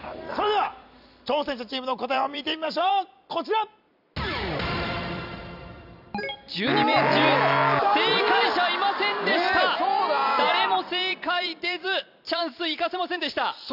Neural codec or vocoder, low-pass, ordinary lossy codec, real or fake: vocoder, 44.1 kHz, 128 mel bands every 256 samples, BigVGAN v2; 5.4 kHz; none; fake